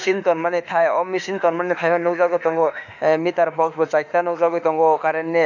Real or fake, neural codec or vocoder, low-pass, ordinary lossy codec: fake; autoencoder, 48 kHz, 32 numbers a frame, DAC-VAE, trained on Japanese speech; 7.2 kHz; none